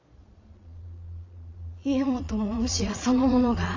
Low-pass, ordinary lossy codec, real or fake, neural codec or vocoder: 7.2 kHz; AAC, 48 kbps; fake; vocoder, 22.05 kHz, 80 mel bands, Vocos